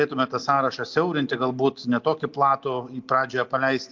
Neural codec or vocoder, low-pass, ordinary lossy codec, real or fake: none; 7.2 kHz; MP3, 64 kbps; real